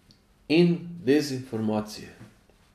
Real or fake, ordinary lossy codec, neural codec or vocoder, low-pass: real; none; none; 14.4 kHz